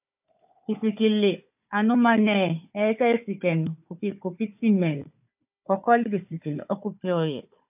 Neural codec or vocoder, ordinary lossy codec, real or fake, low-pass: codec, 16 kHz, 4 kbps, FunCodec, trained on Chinese and English, 50 frames a second; AAC, 32 kbps; fake; 3.6 kHz